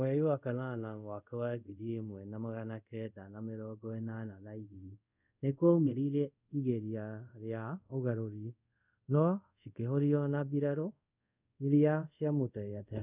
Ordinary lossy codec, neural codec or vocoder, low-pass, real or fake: none; codec, 24 kHz, 0.5 kbps, DualCodec; 3.6 kHz; fake